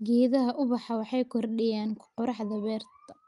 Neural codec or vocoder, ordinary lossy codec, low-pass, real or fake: none; Opus, 32 kbps; 10.8 kHz; real